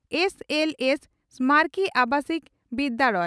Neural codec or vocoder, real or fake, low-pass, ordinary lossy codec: none; real; none; none